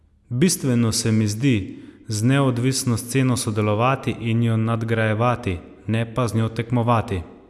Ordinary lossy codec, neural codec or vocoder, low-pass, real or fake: none; none; none; real